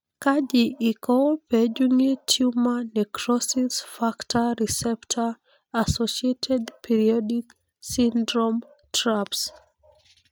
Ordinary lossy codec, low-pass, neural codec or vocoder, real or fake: none; none; none; real